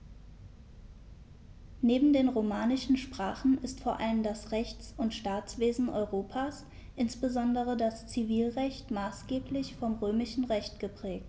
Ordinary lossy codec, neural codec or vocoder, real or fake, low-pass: none; none; real; none